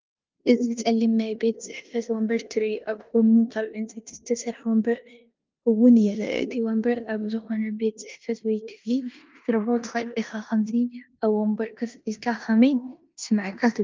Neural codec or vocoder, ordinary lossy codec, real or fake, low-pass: codec, 16 kHz in and 24 kHz out, 0.9 kbps, LongCat-Audio-Codec, four codebook decoder; Opus, 24 kbps; fake; 7.2 kHz